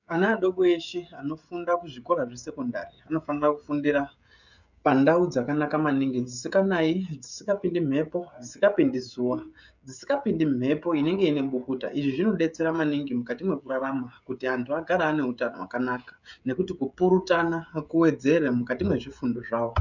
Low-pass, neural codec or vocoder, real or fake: 7.2 kHz; codec, 16 kHz, 16 kbps, FreqCodec, smaller model; fake